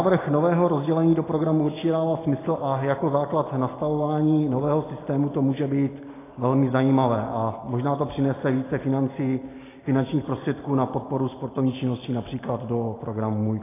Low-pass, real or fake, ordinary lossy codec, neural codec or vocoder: 3.6 kHz; real; AAC, 16 kbps; none